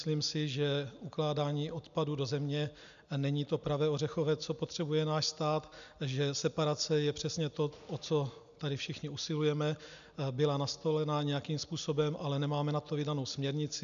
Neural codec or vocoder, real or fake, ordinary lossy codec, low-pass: none; real; AAC, 96 kbps; 7.2 kHz